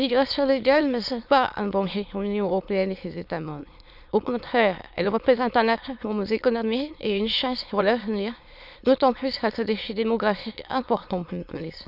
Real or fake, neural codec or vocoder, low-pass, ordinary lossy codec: fake; autoencoder, 22.05 kHz, a latent of 192 numbers a frame, VITS, trained on many speakers; 5.4 kHz; none